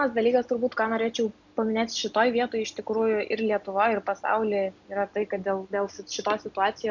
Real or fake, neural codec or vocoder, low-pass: real; none; 7.2 kHz